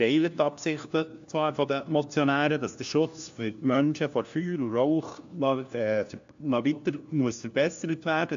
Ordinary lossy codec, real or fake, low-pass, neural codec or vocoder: AAC, 64 kbps; fake; 7.2 kHz; codec, 16 kHz, 1 kbps, FunCodec, trained on LibriTTS, 50 frames a second